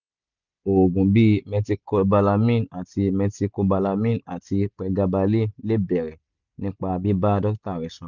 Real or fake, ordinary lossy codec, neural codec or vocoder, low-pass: real; none; none; 7.2 kHz